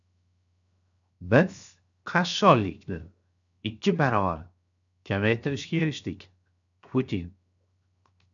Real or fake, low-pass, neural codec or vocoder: fake; 7.2 kHz; codec, 16 kHz, 0.7 kbps, FocalCodec